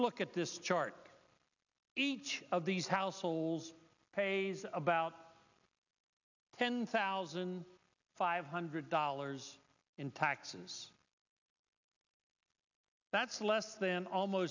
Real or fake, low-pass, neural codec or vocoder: real; 7.2 kHz; none